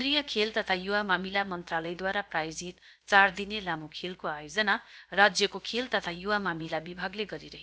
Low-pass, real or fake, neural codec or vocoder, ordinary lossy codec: none; fake; codec, 16 kHz, about 1 kbps, DyCAST, with the encoder's durations; none